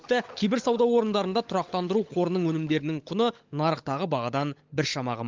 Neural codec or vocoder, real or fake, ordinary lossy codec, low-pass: codec, 16 kHz, 16 kbps, FunCodec, trained on LibriTTS, 50 frames a second; fake; Opus, 32 kbps; 7.2 kHz